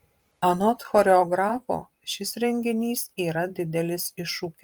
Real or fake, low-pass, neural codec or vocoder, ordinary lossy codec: fake; 19.8 kHz; vocoder, 44.1 kHz, 128 mel bands every 512 samples, BigVGAN v2; Opus, 32 kbps